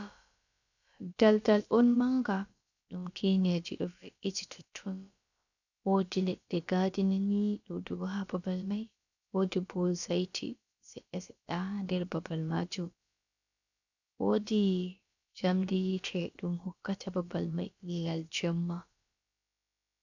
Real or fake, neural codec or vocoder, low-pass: fake; codec, 16 kHz, about 1 kbps, DyCAST, with the encoder's durations; 7.2 kHz